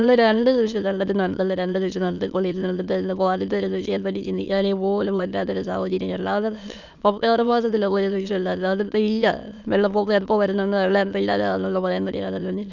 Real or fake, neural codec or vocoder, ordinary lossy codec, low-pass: fake; autoencoder, 22.05 kHz, a latent of 192 numbers a frame, VITS, trained on many speakers; none; 7.2 kHz